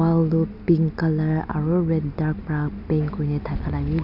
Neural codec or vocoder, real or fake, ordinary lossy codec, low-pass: none; real; none; 5.4 kHz